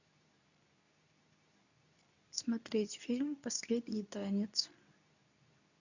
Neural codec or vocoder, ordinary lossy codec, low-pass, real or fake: codec, 24 kHz, 0.9 kbps, WavTokenizer, medium speech release version 2; none; 7.2 kHz; fake